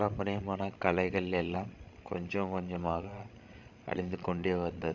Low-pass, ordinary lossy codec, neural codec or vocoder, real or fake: 7.2 kHz; none; codec, 16 kHz, 16 kbps, FreqCodec, larger model; fake